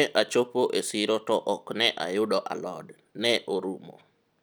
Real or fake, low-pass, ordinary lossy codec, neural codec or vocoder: real; none; none; none